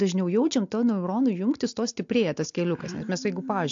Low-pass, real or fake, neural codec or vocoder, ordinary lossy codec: 7.2 kHz; real; none; AAC, 64 kbps